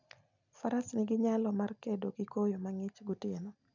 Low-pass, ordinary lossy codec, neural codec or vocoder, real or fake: 7.2 kHz; none; none; real